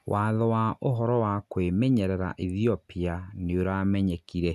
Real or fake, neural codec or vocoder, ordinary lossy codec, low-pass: real; none; none; 14.4 kHz